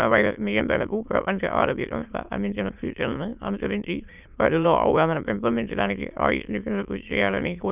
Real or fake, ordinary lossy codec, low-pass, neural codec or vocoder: fake; none; 3.6 kHz; autoencoder, 22.05 kHz, a latent of 192 numbers a frame, VITS, trained on many speakers